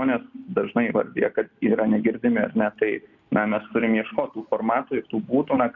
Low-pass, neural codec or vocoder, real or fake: 7.2 kHz; none; real